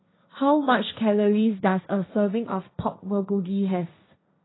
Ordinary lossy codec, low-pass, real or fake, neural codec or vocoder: AAC, 16 kbps; 7.2 kHz; fake; codec, 16 kHz, 1.1 kbps, Voila-Tokenizer